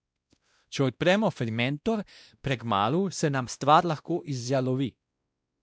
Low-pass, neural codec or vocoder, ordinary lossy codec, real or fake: none; codec, 16 kHz, 1 kbps, X-Codec, WavLM features, trained on Multilingual LibriSpeech; none; fake